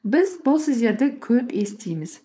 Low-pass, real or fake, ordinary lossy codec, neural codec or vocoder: none; fake; none; codec, 16 kHz, 4.8 kbps, FACodec